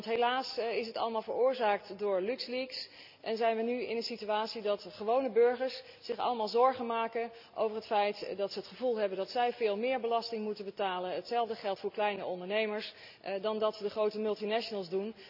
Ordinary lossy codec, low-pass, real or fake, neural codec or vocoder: none; 5.4 kHz; real; none